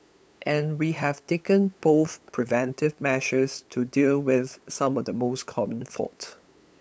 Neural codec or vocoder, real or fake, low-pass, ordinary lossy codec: codec, 16 kHz, 8 kbps, FunCodec, trained on LibriTTS, 25 frames a second; fake; none; none